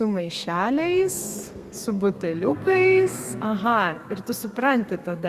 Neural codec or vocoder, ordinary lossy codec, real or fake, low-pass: codec, 32 kHz, 1.9 kbps, SNAC; Opus, 64 kbps; fake; 14.4 kHz